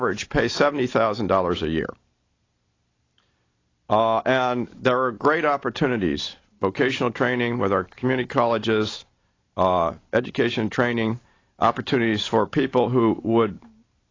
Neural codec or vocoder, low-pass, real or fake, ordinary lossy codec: none; 7.2 kHz; real; AAC, 32 kbps